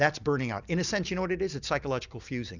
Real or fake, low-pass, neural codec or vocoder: real; 7.2 kHz; none